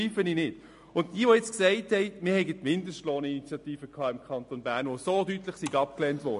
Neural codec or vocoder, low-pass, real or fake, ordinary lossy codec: none; 10.8 kHz; real; MP3, 48 kbps